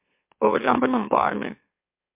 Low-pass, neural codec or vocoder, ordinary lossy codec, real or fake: 3.6 kHz; autoencoder, 44.1 kHz, a latent of 192 numbers a frame, MeloTTS; MP3, 32 kbps; fake